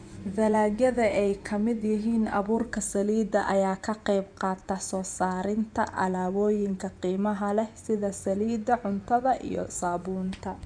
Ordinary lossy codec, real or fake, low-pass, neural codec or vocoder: none; fake; 9.9 kHz; vocoder, 24 kHz, 100 mel bands, Vocos